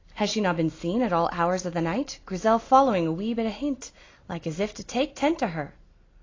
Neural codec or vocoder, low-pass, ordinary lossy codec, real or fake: none; 7.2 kHz; AAC, 32 kbps; real